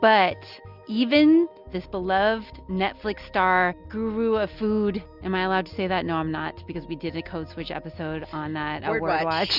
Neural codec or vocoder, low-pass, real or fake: none; 5.4 kHz; real